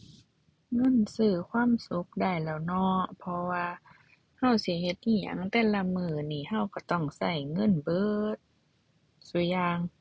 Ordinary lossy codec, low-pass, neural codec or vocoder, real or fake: none; none; none; real